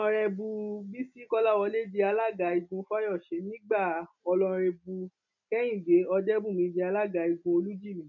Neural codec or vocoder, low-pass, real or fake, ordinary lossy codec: none; 7.2 kHz; real; none